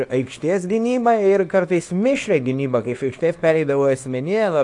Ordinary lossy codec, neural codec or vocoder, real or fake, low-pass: AAC, 64 kbps; codec, 24 kHz, 0.9 kbps, WavTokenizer, small release; fake; 10.8 kHz